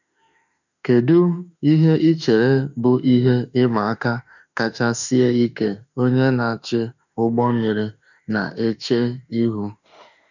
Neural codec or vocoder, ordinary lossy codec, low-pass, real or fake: autoencoder, 48 kHz, 32 numbers a frame, DAC-VAE, trained on Japanese speech; none; 7.2 kHz; fake